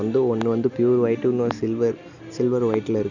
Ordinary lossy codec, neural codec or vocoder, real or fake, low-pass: none; none; real; 7.2 kHz